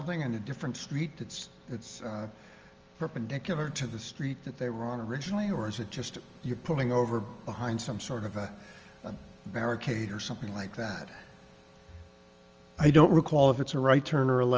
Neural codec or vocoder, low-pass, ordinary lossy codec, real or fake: none; 7.2 kHz; Opus, 24 kbps; real